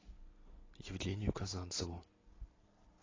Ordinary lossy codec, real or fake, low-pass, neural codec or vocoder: AAC, 32 kbps; real; 7.2 kHz; none